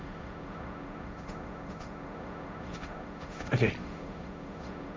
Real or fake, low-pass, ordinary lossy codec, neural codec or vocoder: fake; none; none; codec, 16 kHz, 1.1 kbps, Voila-Tokenizer